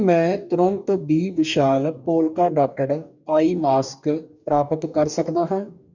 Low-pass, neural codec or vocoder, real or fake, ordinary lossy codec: 7.2 kHz; codec, 44.1 kHz, 2.6 kbps, DAC; fake; none